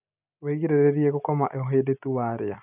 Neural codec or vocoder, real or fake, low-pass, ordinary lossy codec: none; real; 3.6 kHz; none